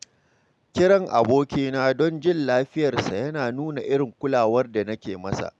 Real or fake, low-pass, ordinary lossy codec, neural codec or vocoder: real; none; none; none